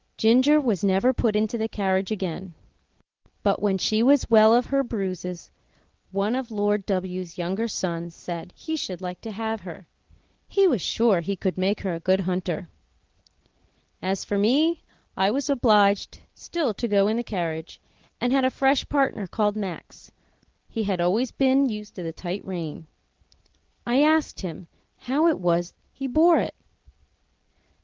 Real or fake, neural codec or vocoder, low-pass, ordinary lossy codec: real; none; 7.2 kHz; Opus, 16 kbps